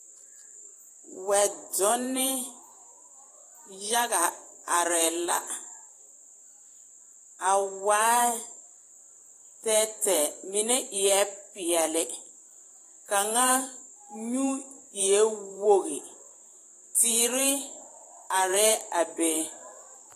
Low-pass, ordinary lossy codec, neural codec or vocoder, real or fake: 14.4 kHz; AAC, 48 kbps; vocoder, 44.1 kHz, 128 mel bands every 512 samples, BigVGAN v2; fake